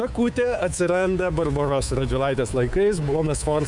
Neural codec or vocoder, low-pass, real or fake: codec, 24 kHz, 3.1 kbps, DualCodec; 10.8 kHz; fake